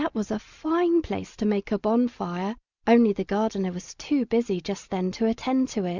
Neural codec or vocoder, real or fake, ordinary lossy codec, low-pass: none; real; Opus, 64 kbps; 7.2 kHz